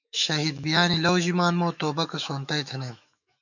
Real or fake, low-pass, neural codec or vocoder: fake; 7.2 kHz; autoencoder, 48 kHz, 128 numbers a frame, DAC-VAE, trained on Japanese speech